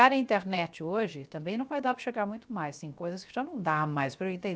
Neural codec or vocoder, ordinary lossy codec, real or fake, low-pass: codec, 16 kHz, 0.7 kbps, FocalCodec; none; fake; none